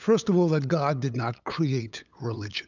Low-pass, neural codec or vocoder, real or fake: 7.2 kHz; codec, 16 kHz, 8 kbps, FunCodec, trained on LibriTTS, 25 frames a second; fake